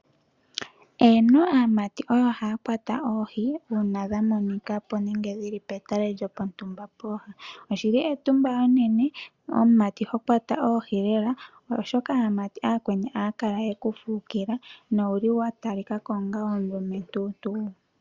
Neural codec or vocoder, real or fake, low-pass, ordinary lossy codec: none; real; 7.2 kHz; Opus, 64 kbps